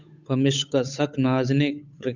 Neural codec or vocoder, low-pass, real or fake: codec, 16 kHz, 8 kbps, FunCodec, trained on Chinese and English, 25 frames a second; 7.2 kHz; fake